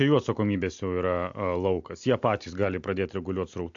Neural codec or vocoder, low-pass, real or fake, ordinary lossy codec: none; 7.2 kHz; real; AAC, 64 kbps